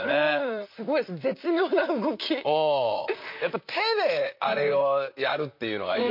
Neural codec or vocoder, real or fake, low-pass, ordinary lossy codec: vocoder, 44.1 kHz, 128 mel bands, Pupu-Vocoder; fake; 5.4 kHz; MP3, 32 kbps